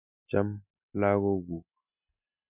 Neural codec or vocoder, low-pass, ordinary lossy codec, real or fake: none; 3.6 kHz; none; real